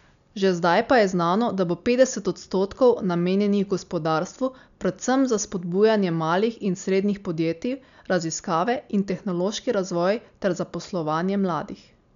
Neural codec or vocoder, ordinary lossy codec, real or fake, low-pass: none; none; real; 7.2 kHz